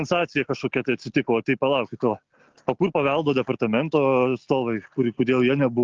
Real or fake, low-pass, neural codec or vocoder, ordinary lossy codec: real; 7.2 kHz; none; Opus, 24 kbps